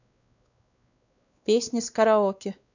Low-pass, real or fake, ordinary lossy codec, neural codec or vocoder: 7.2 kHz; fake; none; codec, 16 kHz, 2 kbps, X-Codec, WavLM features, trained on Multilingual LibriSpeech